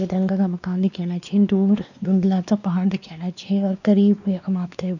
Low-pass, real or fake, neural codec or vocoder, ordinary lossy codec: 7.2 kHz; fake; codec, 16 kHz, 2 kbps, X-Codec, WavLM features, trained on Multilingual LibriSpeech; none